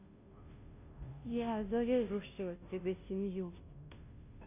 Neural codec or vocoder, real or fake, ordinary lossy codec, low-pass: codec, 16 kHz, 0.5 kbps, FunCodec, trained on Chinese and English, 25 frames a second; fake; none; 3.6 kHz